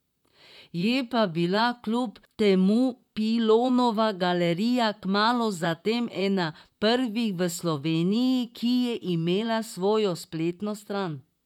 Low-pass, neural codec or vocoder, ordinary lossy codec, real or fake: 19.8 kHz; vocoder, 44.1 kHz, 128 mel bands, Pupu-Vocoder; none; fake